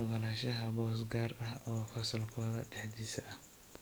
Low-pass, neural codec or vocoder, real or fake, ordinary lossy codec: none; codec, 44.1 kHz, 7.8 kbps, DAC; fake; none